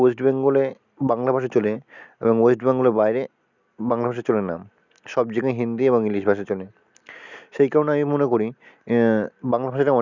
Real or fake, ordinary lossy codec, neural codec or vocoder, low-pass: real; none; none; 7.2 kHz